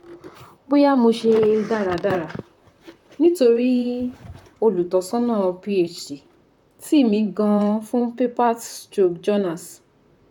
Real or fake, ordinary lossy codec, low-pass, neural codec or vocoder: fake; none; 19.8 kHz; vocoder, 44.1 kHz, 128 mel bands, Pupu-Vocoder